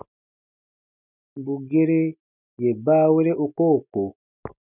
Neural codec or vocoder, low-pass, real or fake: none; 3.6 kHz; real